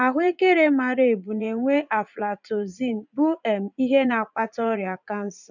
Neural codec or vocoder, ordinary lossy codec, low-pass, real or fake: vocoder, 44.1 kHz, 80 mel bands, Vocos; none; 7.2 kHz; fake